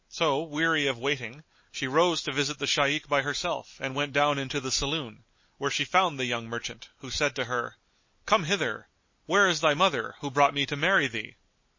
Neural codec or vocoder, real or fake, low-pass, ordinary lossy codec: none; real; 7.2 kHz; MP3, 32 kbps